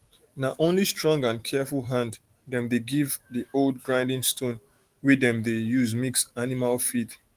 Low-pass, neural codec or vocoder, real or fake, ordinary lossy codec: 14.4 kHz; autoencoder, 48 kHz, 128 numbers a frame, DAC-VAE, trained on Japanese speech; fake; Opus, 24 kbps